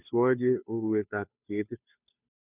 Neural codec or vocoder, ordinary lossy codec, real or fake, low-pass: codec, 16 kHz, 2 kbps, FunCodec, trained on Chinese and English, 25 frames a second; none; fake; 3.6 kHz